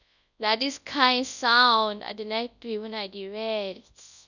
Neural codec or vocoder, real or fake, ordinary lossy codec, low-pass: codec, 24 kHz, 0.9 kbps, WavTokenizer, large speech release; fake; none; 7.2 kHz